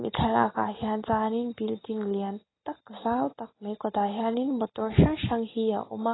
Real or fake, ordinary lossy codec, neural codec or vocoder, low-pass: real; AAC, 16 kbps; none; 7.2 kHz